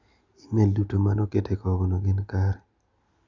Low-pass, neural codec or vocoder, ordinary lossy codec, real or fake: 7.2 kHz; none; Opus, 64 kbps; real